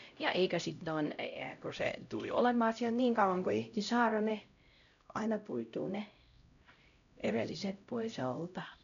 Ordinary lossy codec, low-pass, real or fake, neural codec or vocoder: none; 7.2 kHz; fake; codec, 16 kHz, 0.5 kbps, X-Codec, HuBERT features, trained on LibriSpeech